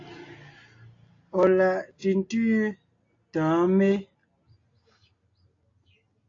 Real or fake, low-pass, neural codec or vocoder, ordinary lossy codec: real; 7.2 kHz; none; AAC, 32 kbps